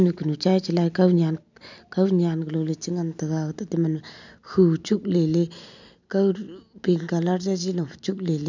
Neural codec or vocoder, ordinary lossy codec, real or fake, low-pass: none; none; real; 7.2 kHz